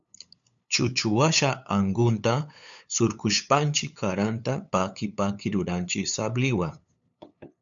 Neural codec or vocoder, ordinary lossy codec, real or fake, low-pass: codec, 16 kHz, 8 kbps, FunCodec, trained on LibriTTS, 25 frames a second; MP3, 96 kbps; fake; 7.2 kHz